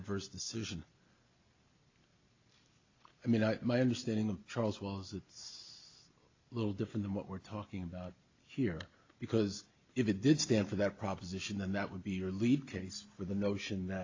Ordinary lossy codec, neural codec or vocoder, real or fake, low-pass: AAC, 48 kbps; none; real; 7.2 kHz